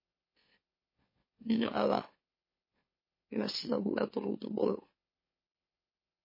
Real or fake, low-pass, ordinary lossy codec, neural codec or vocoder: fake; 5.4 kHz; MP3, 24 kbps; autoencoder, 44.1 kHz, a latent of 192 numbers a frame, MeloTTS